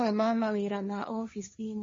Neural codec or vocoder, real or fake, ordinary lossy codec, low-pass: codec, 16 kHz, 1.1 kbps, Voila-Tokenizer; fake; MP3, 32 kbps; 7.2 kHz